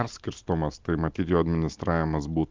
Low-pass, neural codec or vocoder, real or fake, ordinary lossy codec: 7.2 kHz; none; real; Opus, 32 kbps